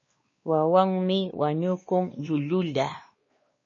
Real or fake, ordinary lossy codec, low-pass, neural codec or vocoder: fake; MP3, 32 kbps; 7.2 kHz; codec, 16 kHz, 2 kbps, X-Codec, WavLM features, trained on Multilingual LibriSpeech